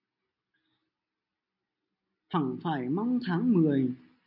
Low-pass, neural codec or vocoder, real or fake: 5.4 kHz; none; real